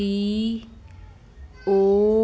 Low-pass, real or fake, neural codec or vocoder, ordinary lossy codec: none; real; none; none